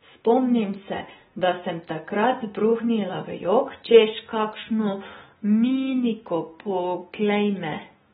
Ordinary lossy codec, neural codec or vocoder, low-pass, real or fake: AAC, 16 kbps; none; 19.8 kHz; real